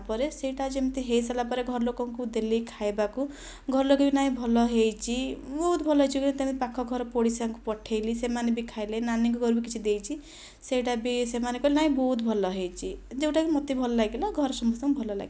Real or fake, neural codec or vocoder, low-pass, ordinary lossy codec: real; none; none; none